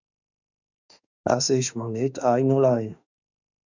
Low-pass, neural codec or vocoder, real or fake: 7.2 kHz; autoencoder, 48 kHz, 32 numbers a frame, DAC-VAE, trained on Japanese speech; fake